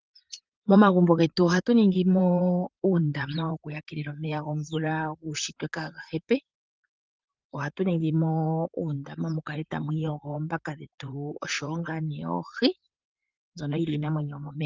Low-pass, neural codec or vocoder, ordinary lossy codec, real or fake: 7.2 kHz; vocoder, 44.1 kHz, 128 mel bands, Pupu-Vocoder; Opus, 24 kbps; fake